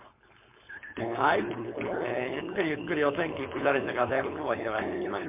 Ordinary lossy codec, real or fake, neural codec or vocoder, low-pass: MP3, 32 kbps; fake; codec, 16 kHz, 4.8 kbps, FACodec; 3.6 kHz